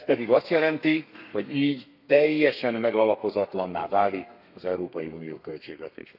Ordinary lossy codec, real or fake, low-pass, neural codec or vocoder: none; fake; 5.4 kHz; codec, 32 kHz, 1.9 kbps, SNAC